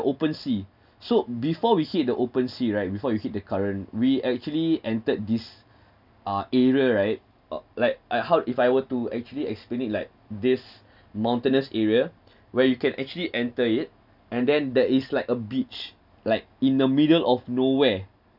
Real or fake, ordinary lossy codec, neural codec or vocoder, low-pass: real; none; none; 5.4 kHz